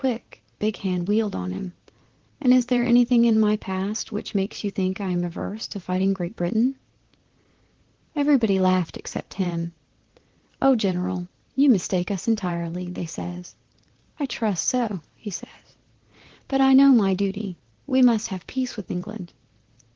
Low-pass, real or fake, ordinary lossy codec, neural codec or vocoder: 7.2 kHz; fake; Opus, 16 kbps; vocoder, 44.1 kHz, 128 mel bands, Pupu-Vocoder